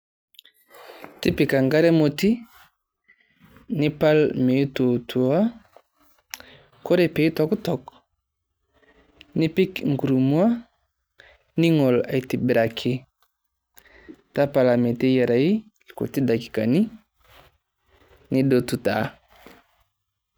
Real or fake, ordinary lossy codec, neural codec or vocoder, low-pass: real; none; none; none